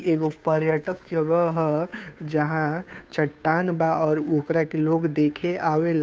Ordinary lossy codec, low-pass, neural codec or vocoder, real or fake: none; none; codec, 16 kHz, 2 kbps, FunCodec, trained on Chinese and English, 25 frames a second; fake